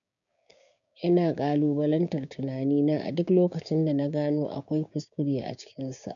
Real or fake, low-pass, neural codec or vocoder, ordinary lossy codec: fake; 7.2 kHz; codec, 16 kHz, 6 kbps, DAC; none